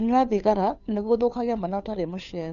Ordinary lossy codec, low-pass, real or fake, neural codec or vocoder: none; 7.2 kHz; fake; codec, 16 kHz, 2 kbps, FunCodec, trained on Chinese and English, 25 frames a second